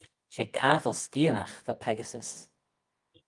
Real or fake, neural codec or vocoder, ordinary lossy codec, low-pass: fake; codec, 24 kHz, 0.9 kbps, WavTokenizer, medium music audio release; Opus, 24 kbps; 10.8 kHz